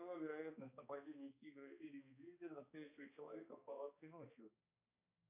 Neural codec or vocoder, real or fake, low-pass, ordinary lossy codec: codec, 16 kHz, 2 kbps, X-Codec, HuBERT features, trained on balanced general audio; fake; 3.6 kHz; AAC, 32 kbps